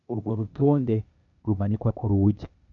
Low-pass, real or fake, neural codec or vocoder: 7.2 kHz; fake; codec, 16 kHz, 0.8 kbps, ZipCodec